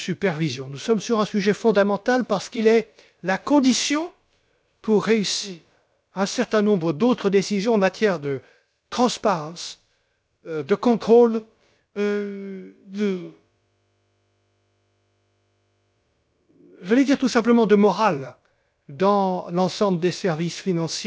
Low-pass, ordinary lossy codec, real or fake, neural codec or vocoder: none; none; fake; codec, 16 kHz, about 1 kbps, DyCAST, with the encoder's durations